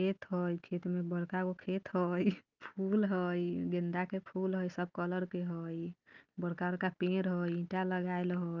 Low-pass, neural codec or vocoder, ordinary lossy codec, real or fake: 7.2 kHz; none; Opus, 32 kbps; real